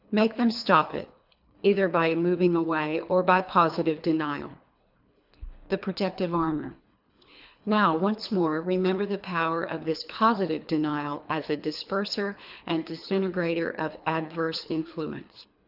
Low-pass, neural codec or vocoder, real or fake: 5.4 kHz; codec, 24 kHz, 3 kbps, HILCodec; fake